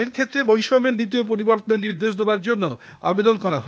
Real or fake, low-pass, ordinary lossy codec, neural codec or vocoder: fake; none; none; codec, 16 kHz, 0.8 kbps, ZipCodec